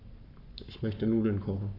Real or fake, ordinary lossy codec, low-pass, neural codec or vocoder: fake; none; 5.4 kHz; codec, 44.1 kHz, 7.8 kbps, Pupu-Codec